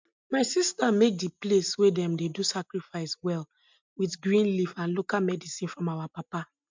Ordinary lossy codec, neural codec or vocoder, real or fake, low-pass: MP3, 64 kbps; none; real; 7.2 kHz